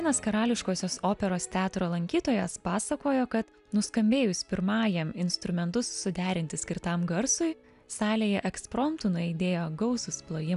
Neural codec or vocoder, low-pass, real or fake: none; 10.8 kHz; real